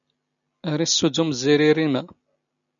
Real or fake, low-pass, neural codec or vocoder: real; 7.2 kHz; none